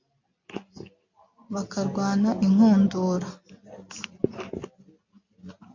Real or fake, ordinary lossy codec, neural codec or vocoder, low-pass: real; MP3, 48 kbps; none; 7.2 kHz